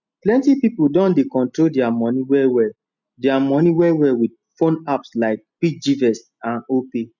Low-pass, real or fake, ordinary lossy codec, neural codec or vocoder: 7.2 kHz; real; none; none